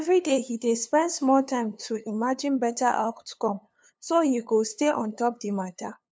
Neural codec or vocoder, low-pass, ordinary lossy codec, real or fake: codec, 16 kHz, 2 kbps, FunCodec, trained on LibriTTS, 25 frames a second; none; none; fake